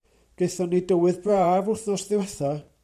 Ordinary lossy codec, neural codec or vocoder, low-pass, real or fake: AAC, 96 kbps; vocoder, 44.1 kHz, 128 mel bands every 512 samples, BigVGAN v2; 14.4 kHz; fake